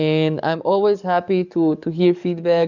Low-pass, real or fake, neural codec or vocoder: 7.2 kHz; fake; codec, 44.1 kHz, 7.8 kbps, DAC